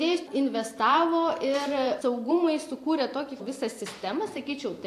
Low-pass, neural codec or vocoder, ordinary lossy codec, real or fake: 14.4 kHz; vocoder, 44.1 kHz, 128 mel bands every 512 samples, BigVGAN v2; AAC, 64 kbps; fake